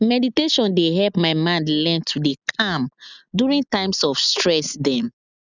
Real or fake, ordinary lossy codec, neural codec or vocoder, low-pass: real; none; none; 7.2 kHz